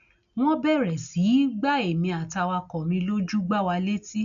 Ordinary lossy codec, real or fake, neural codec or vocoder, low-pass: none; real; none; 7.2 kHz